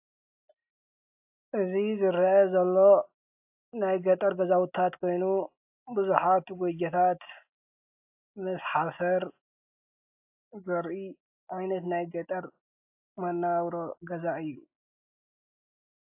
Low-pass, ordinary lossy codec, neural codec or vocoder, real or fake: 3.6 kHz; AAC, 32 kbps; none; real